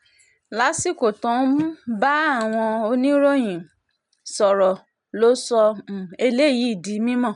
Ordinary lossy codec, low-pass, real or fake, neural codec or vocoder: none; 10.8 kHz; real; none